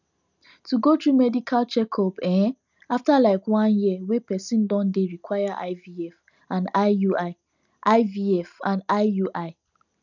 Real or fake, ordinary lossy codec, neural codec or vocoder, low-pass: real; none; none; 7.2 kHz